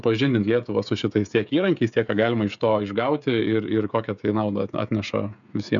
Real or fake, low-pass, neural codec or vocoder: fake; 7.2 kHz; codec, 16 kHz, 16 kbps, FreqCodec, smaller model